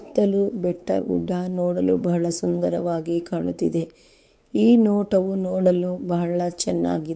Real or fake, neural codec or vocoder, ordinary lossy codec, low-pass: fake; codec, 16 kHz, 4 kbps, X-Codec, WavLM features, trained on Multilingual LibriSpeech; none; none